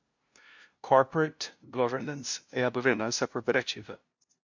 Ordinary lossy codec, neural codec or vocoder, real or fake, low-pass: MP3, 48 kbps; codec, 16 kHz, 0.5 kbps, FunCodec, trained on LibriTTS, 25 frames a second; fake; 7.2 kHz